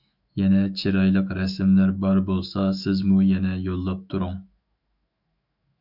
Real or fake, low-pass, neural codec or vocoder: fake; 5.4 kHz; autoencoder, 48 kHz, 128 numbers a frame, DAC-VAE, trained on Japanese speech